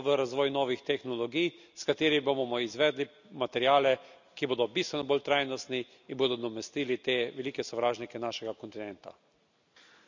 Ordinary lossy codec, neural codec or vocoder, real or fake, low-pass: none; none; real; 7.2 kHz